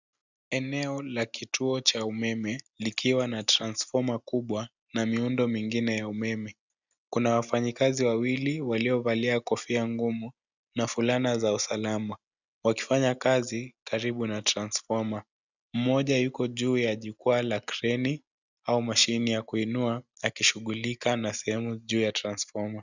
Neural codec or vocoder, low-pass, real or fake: none; 7.2 kHz; real